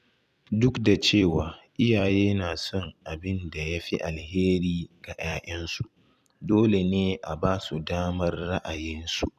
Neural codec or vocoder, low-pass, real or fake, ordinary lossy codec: vocoder, 44.1 kHz, 128 mel bands every 256 samples, BigVGAN v2; 14.4 kHz; fake; none